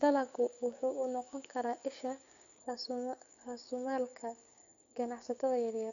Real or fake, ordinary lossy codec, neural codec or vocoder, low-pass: fake; MP3, 64 kbps; codec, 16 kHz, 6 kbps, DAC; 7.2 kHz